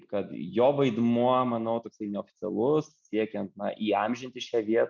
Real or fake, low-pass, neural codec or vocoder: real; 7.2 kHz; none